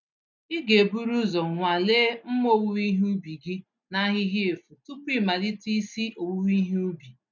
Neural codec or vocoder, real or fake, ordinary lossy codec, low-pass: none; real; none; 7.2 kHz